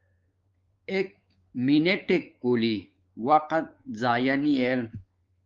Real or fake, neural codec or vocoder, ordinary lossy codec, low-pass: fake; codec, 16 kHz, 4 kbps, X-Codec, WavLM features, trained on Multilingual LibriSpeech; Opus, 32 kbps; 7.2 kHz